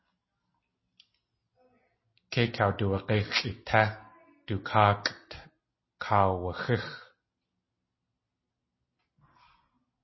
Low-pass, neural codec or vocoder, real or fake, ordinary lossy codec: 7.2 kHz; none; real; MP3, 24 kbps